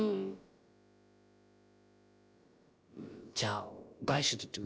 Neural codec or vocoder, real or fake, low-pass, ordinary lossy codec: codec, 16 kHz, about 1 kbps, DyCAST, with the encoder's durations; fake; none; none